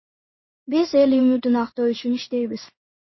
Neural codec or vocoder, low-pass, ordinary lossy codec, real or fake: codec, 16 kHz in and 24 kHz out, 1 kbps, XY-Tokenizer; 7.2 kHz; MP3, 24 kbps; fake